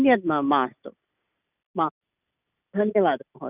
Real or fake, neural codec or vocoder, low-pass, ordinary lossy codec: real; none; 3.6 kHz; none